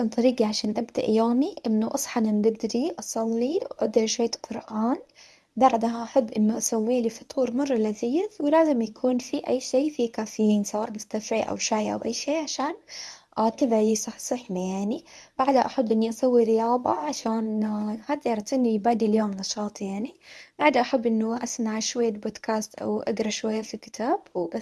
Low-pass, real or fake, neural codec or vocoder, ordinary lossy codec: none; fake; codec, 24 kHz, 0.9 kbps, WavTokenizer, medium speech release version 1; none